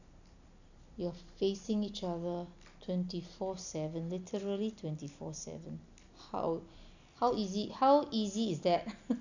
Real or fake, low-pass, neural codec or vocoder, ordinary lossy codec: real; 7.2 kHz; none; none